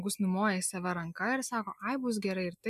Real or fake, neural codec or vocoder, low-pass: real; none; 14.4 kHz